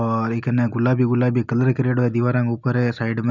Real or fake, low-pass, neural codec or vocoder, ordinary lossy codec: real; 7.2 kHz; none; none